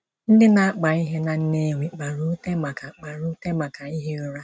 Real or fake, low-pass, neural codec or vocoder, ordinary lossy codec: real; none; none; none